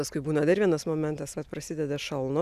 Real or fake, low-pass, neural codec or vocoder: real; 14.4 kHz; none